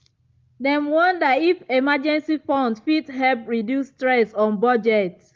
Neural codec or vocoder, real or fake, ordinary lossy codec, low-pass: none; real; Opus, 32 kbps; 7.2 kHz